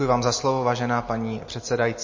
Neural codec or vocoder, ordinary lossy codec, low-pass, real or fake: none; MP3, 32 kbps; 7.2 kHz; real